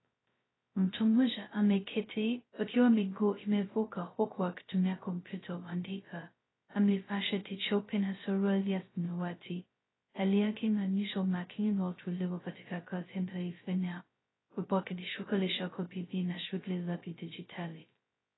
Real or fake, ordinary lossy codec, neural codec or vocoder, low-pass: fake; AAC, 16 kbps; codec, 16 kHz, 0.2 kbps, FocalCodec; 7.2 kHz